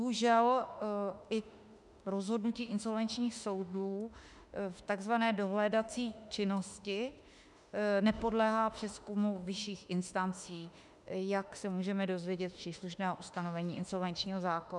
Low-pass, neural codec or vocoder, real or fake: 10.8 kHz; autoencoder, 48 kHz, 32 numbers a frame, DAC-VAE, trained on Japanese speech; fake